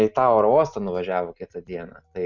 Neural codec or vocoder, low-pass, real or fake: none; 7.2 kHz; real